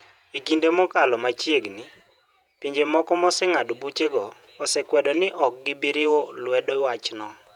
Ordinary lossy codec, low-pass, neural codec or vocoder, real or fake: none; 19.8 kHz; vocoder, 48 kHz, 128 mel bands, Vocos; fake